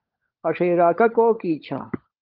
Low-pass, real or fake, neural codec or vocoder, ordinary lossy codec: 5.4 kHz; fake; codec, 16 kHz, 16 kbps, FunCodec, trained on LibriTTS, 50 frames a second; Opus, 32 kbps